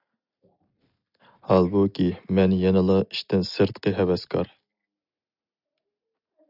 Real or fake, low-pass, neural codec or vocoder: real; 5.4 kHz; none